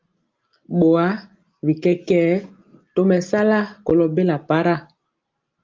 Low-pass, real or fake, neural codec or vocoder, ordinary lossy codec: 7.2 kHz; real; none; Opus, 32 kbps